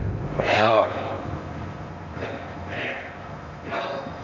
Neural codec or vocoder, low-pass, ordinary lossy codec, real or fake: codec, 16 kHz in and 24 kHz out, 0.6 kbps, FocalCodec, streaming, 4096 codes; 7.2 kHz; MP3, 48 kbps; fake